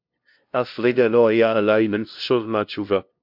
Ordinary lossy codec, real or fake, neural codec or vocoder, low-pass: MP3, 48 kbps; fake; codec, 16 kHz, 0.5 kbps, FunCodec, trained on LibriTTS, 25 frames a second; 5.4 kHz